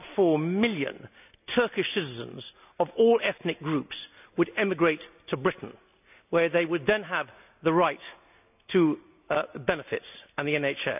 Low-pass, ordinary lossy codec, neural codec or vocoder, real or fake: 3.6 kHz; none; none; real